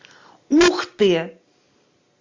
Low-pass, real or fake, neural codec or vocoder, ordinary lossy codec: 7.2 kHz; real; none; MP3, 64 kbps